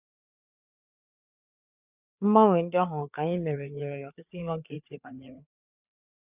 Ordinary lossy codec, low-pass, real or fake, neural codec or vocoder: none; 3.6 kHz; fake; codec, 16 kHz, 4 kbps, FreqCodec, larger model